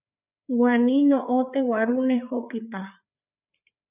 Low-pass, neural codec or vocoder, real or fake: 3.6 kHz; codec, 16 kHz, 4 kbps, FreqCodec, larger model; fake